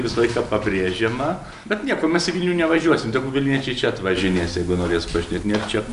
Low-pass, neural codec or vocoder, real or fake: 10.8 kHz; none; real